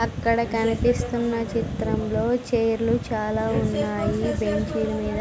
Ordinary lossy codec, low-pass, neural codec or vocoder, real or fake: none; none; none; real